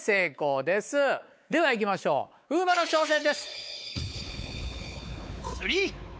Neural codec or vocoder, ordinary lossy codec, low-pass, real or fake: codec, 16 kHz, 4 kbps, X-Codec, WavLM features, trained on Multilingual LibriSpeech; none; none; fake